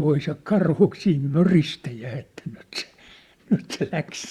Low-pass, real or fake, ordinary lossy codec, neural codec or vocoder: 19.8 kHz; fake; Opus, 64 kbps; vocoder, 44.1 kHz, 128 mel bands, Pupu-Vocoder